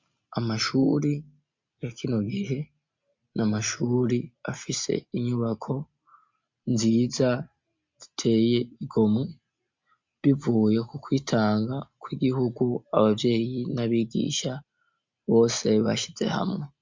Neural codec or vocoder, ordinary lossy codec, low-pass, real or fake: none; MP3, 64 kbps; 7.2 kHz; real